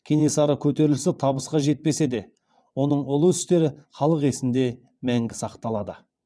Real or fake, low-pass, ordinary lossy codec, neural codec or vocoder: fake; none; none; vocoder, 22.05 kHz, 80 mel bands, WaveNeXt